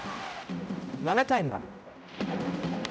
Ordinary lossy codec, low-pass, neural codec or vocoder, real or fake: none; none; codec, 16 kHz, 0.5 kbps, X-Codec, HuBERT features, trained on general audio; fake